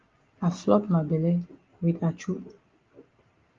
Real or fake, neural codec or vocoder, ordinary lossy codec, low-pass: real; none; Opus, 32 kbps; 7.2 kHz